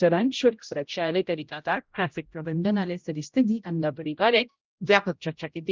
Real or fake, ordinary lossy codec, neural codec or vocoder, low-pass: fake; Opus, 24 kbps; codec, 16 kHz, 0.5 kbps, X-Codec, HuBERT features, trained on general audio; 7.2 kHz